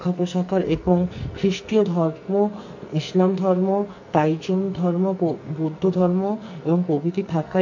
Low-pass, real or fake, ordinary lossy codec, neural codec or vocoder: 7.2 kHz; fake; MP3, 48 kbps; codec, 44.1 kHz, 2.6 kbps, SNAC